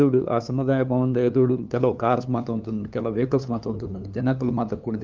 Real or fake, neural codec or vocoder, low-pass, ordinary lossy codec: fake; codec, 16 kHz, 2 kbps, FunCodec, trained on LibriTTS, 25 frames a second; 7.2 kHz; Opus, 24 kbps